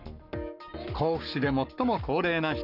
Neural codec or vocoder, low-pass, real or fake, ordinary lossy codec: codec, 44.1 kHz, 7.8 kbps, Pupu-Codec; 5.4 kHz; fake; none